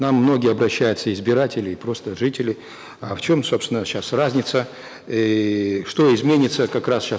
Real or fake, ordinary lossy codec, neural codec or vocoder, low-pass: real; none; none; none